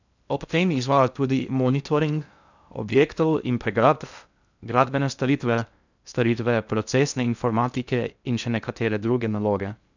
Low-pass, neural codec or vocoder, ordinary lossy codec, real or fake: 7.2 kHz; codec, 16 kHz in and 24 kHz out, 0.6 kbps, FocalCodec, streaming, 2048 codes; none; fake